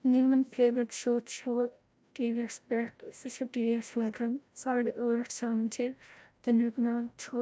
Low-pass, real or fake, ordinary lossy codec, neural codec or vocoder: none; fake; none; codec, 16 kHz, 0.5 kbps, FreqCodec, larger model